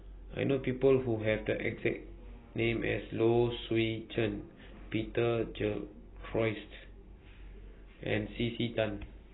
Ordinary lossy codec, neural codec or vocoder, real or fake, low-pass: AAC, 16 kbps; none; real; 7.2 kHz